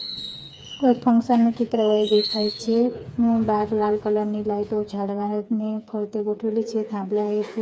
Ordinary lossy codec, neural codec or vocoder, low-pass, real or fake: none; codec, 16 kHz, 4 kbps, FreqCodec, smaller model; none; fake